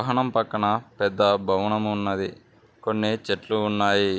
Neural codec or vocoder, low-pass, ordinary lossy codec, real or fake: none; none; none; real